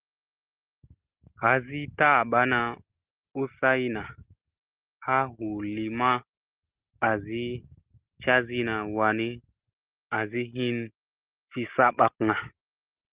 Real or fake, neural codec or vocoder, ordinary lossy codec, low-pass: real; none; Opus, 16 kbps; 3.6 kHz